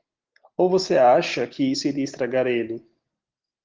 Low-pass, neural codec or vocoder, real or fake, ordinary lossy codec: 7.2 kHz; none; real; Opus, 16 kbps